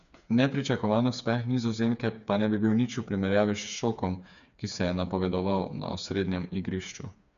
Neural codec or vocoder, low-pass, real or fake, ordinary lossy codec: codec, 16 kHz, 4 kbps, FreqCodec, smaller model; 7.2 kHz; fake; none